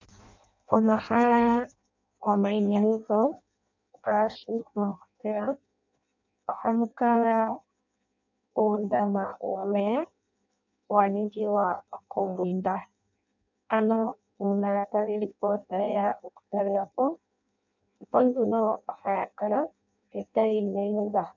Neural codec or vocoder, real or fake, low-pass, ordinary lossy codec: codec, 16 kHz in and 24 kHz out, 0.6 kbps, FireRedTTS-2 codec; fake; 7.2 kHz; MP3, 64 kbps